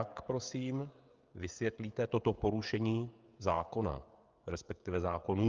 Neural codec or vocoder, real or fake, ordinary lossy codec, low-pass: codec, 16 kHz, 16 kbps, FreqCodec, smaller model; fake; Opus, 24 kbps; 7.2 kHz